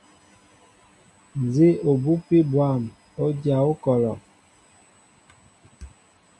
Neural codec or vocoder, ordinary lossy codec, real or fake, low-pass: none; MP3, 64 kbps; real; 10.8 kHz